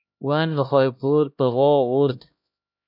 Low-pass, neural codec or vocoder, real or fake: 5.4 kHz; codec, 16 kHz, 1 kbps, X-Codec, HuBERT features, trained on LibriSpeech; fake